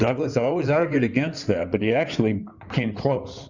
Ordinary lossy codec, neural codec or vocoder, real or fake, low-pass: Opus, 64 kbps; codec, 16 kHz in and 24 kHz out, 2.2 kbps, FireRedTTS-2 codec; fake; 7.2 kHz